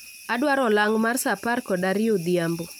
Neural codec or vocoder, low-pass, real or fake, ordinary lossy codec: none; none; real; none